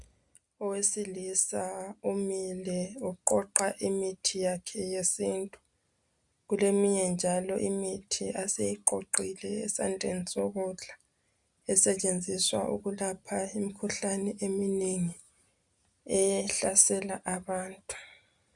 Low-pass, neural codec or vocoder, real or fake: 10.8 kHz; none; real